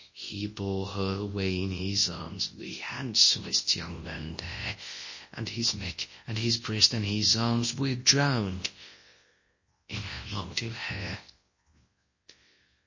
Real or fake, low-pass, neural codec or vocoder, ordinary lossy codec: fake; 7.2 kHz; codec, 24 kHz, 0.9 kbps, WavTokenizer, large speech release; MP3, 32 kbps